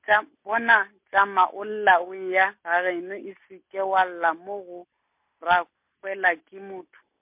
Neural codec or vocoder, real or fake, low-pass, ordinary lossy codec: none; real; 3.6 kHz; MP3, 32 kbps